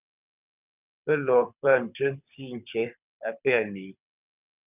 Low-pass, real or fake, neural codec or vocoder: 3.6 kHz; fake; codec, 24 kHz, 6 kbps, HILCodec